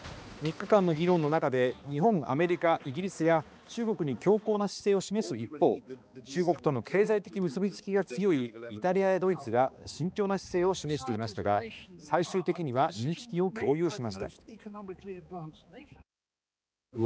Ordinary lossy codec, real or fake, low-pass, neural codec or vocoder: none; fake; none; codec, 16 kHz, 2 kbps, X-Codec, HuBERT features, trained on balanced general audio